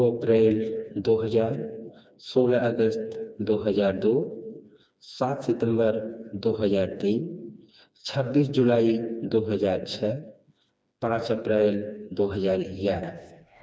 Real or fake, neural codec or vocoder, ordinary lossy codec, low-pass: fake; codec, 16 kHz, 2 kbps, FreqCodec, smaller model; none; none